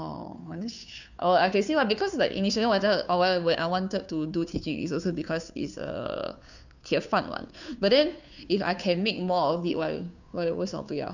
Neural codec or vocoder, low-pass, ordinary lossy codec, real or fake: codec, 16 kHz, 2 kbps, FunCodec, trained on Chinese and English, 25 frames a second; 7.2 kHz; none; fake